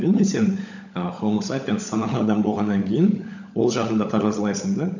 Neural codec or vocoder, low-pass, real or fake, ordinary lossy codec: codec, 16 kHz, 16 kbps, FunCodec, trained on LibriTTS, 50 frames a second; 7.2 kHz; fake; none